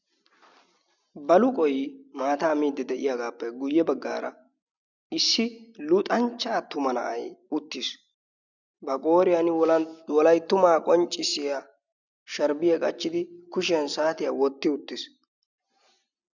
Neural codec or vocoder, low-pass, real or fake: none; 7.2 kHz; real